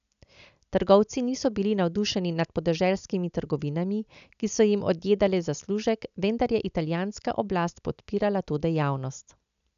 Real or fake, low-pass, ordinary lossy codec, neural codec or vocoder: real; 7.2 kHz; none; none